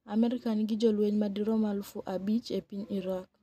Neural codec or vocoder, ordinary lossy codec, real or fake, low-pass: none; none; real; 14.4 kHz